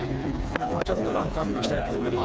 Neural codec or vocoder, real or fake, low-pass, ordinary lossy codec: codec, 16 kHz, 4 kbps, FreqCodec, smaller model; fake; none; none